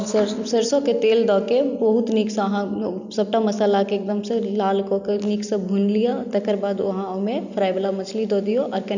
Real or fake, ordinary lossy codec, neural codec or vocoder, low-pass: real; none; none; 7.2 kHz